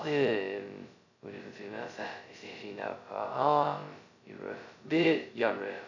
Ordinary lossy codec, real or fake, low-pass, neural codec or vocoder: none; fake; 7.2 kHz; codec, 16 kHz, 0.2 kbps, FocalCodec